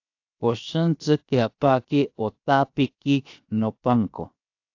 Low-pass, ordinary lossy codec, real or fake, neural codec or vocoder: 7.2 kHz; AAC, 48 kbps; fake; codec, 16 kHz, 0.7 kbps, FocalCodec